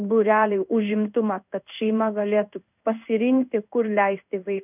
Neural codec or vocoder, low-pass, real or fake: codec, 16 kHz in and 24 kHz out, 1 kbps, XY-Tokenizer; 3.6 kHz; fake